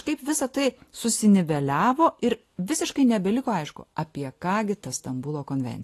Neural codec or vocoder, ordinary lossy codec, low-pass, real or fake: none; AAC, 48 kbps; 14.4 kHz; real